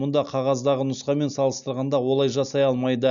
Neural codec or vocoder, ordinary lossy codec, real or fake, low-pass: none; none; real; 7.2 kHz